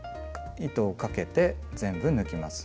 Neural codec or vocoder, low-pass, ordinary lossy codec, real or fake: none; none; none; real